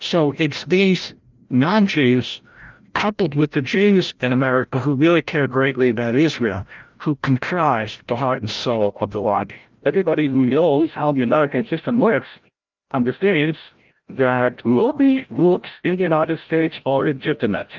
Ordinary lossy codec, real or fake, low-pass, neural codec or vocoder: Opus, 24 kbps; fake; 7.2 kHz; codec, 16 kHz, 0.5 kbps, FreqCodec, larger model